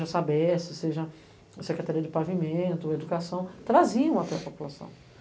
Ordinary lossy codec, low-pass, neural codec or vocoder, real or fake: none; none; none; real